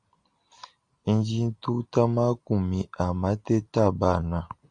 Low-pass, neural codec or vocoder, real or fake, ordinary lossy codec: 9.9 kHz; none; real; Opus, 64 kbps